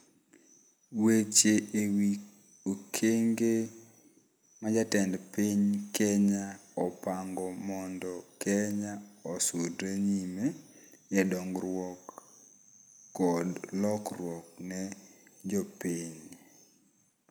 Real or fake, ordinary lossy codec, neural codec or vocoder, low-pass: real; none; none; none